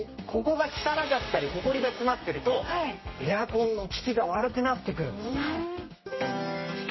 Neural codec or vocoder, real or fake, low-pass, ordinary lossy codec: codec, 32 kHz, 1.9 kbps, SNAC; fake; 7.2 kHz; MP3, 24 kbps